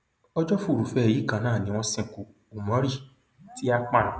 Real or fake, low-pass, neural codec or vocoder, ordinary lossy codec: real; none; none; none